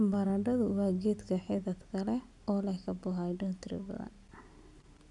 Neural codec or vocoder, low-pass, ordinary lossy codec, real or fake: none; 10.8 kHz; none; real